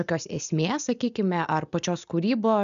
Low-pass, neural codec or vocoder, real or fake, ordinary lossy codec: 7.2 kHz; none; real; MP3, 96 kbps